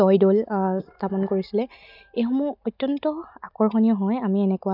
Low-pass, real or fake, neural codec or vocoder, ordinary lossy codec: 5.4 kHz; real; none; none